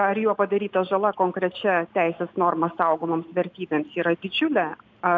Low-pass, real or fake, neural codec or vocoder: 7.2 kHz; real; none